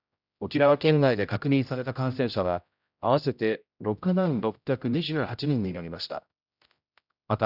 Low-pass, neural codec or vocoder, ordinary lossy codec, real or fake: 5.4 kHz; codec, 16 kHz, 0.5 kbps, X-Codec, HuBERT features, trained on general audio; none; fake